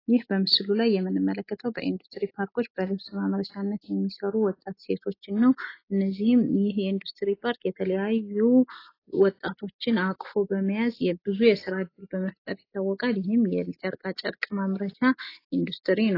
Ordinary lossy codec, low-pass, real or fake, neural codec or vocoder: AAC, 24 kbps; 5.4 kHz; real; none